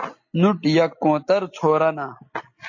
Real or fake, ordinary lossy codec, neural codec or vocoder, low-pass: real; MP3, 32 kbps; none; 7.2 kHz